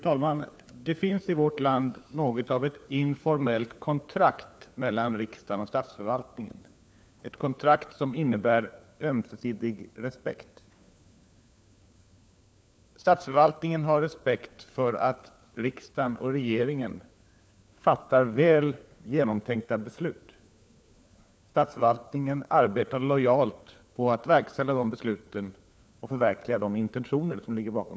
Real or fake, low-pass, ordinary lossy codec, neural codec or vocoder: fake; none; none; codec, 16 kHz, 4 kbps, FunCodec, trained on LibriTTS, 50 frames a second